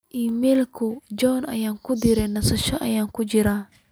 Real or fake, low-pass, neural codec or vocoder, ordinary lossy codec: real; none; none; none